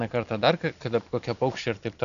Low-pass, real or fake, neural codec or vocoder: 7.2 kHz; real; none